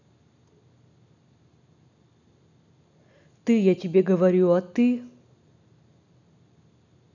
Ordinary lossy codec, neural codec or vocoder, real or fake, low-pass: none; none; real; 7.2 kHz